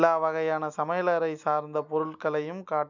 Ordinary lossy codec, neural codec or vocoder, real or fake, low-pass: none; none; real; 7.2 kHz